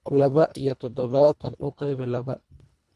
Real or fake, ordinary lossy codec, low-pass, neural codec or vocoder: fake; none; none; codec, 24 kHz, 1.5 kbps, HILCodec